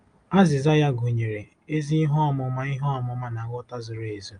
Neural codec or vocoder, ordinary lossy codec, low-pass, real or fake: none; Opus, 32 kbps; 9.9 kHz; real